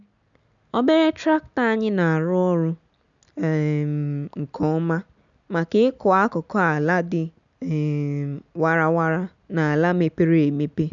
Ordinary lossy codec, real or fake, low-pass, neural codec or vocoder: none; real; 7.2 kHz; none